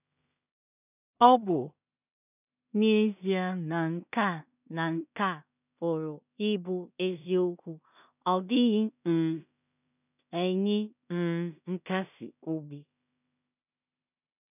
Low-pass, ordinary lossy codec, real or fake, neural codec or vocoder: 3.6 kHz; none; fake; codec, 16 kHz in and 24 kHz out, 0.4 kbps, LongCat-Audio-Codec, two codebook decoder